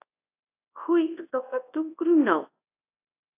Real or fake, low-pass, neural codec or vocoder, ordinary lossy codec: fake; 3.6 kHz; codec, 24 kHz, 0.9 kbps, WavTokenizer, large speech release; AAC, 24 kbps